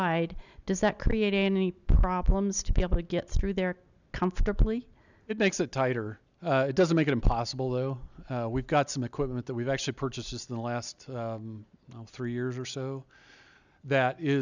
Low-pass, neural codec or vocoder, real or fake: 7.2 kHz; none; real